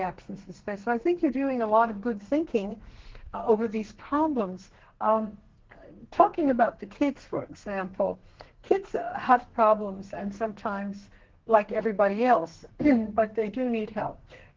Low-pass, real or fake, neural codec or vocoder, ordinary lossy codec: 7.2 kHz; fake; codec, 32 kHz, 1.9 kbps, SNAC; Opus, 16 kbps